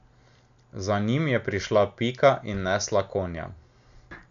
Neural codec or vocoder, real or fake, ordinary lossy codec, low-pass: none; real; none; 7.2 kHz